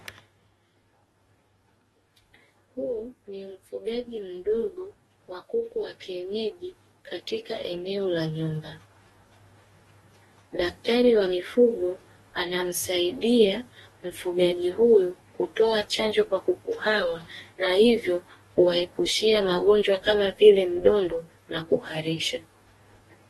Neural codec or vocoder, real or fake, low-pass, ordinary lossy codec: codec, 44.1 kHz, 2.6 kbps, DAC; fake; 19.8 kHz; AAC, 32 kbps